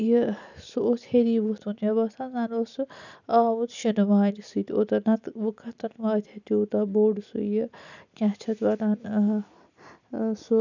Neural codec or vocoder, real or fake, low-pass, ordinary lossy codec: none; real; 7.2 kHz; none